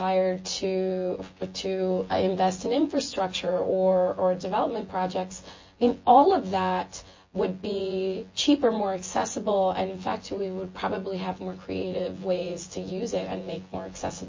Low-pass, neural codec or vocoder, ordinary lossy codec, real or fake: 7.2 kHz; vocoder, 24 kHz, 100 mel bands, Vocos; MP3, 32 kbps; fake